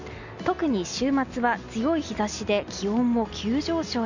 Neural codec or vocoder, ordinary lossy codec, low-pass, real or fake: none; none; 7.2 kHz; real